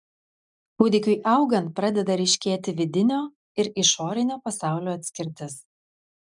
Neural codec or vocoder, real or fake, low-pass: none; real; 10.8 kHz